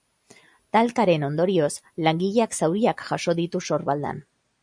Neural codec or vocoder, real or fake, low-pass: none; real; 9.9 kHz